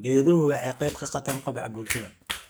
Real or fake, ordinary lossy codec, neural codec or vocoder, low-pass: fake; none; codec, 44.1 kHz, 2.6 kbps, SNAC; none